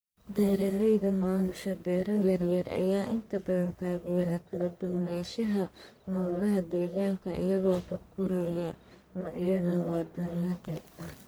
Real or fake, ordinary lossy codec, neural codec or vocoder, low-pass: fake; none; codec, 44.1 kHz, 1.7 kbps, Pupu-Codec; none